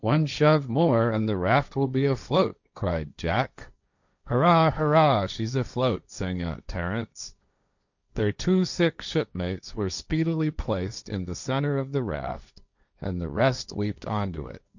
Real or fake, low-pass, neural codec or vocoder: fake; 7.2 kHz; codec, 16 kHz, 1.1 kbps, Voila-Tokenizer